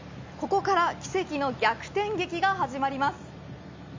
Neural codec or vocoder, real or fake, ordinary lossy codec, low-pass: none; real; MP3, 48 kbps; 7.2 kHz